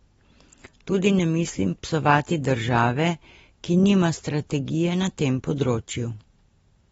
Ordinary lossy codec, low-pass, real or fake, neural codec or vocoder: AAC, 24 kbps; 19.8 kHz; real; none